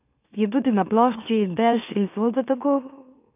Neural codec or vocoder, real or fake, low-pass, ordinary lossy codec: autoencoder, 44.1 kHz, a latent of 192 numbers a frame, MeloTTS; fake; 3.6 kHz; none